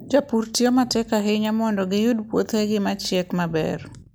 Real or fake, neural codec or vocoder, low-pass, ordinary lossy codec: real; none; none; none